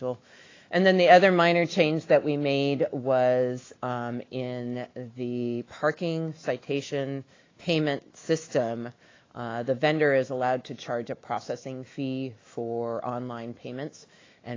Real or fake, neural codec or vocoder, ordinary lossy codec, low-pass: fake; autoencoder, 48 kHz, 128 numbers a frame, DAC-VAE, trained on Japanese speech; AAC, 32 kbps; 7.2 kHz